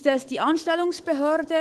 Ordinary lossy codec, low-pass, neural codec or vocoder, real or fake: Opus, 16 kbps; 10.8 kHz; codec, 24 kHz, 3.1 kbps, DualCodec; fake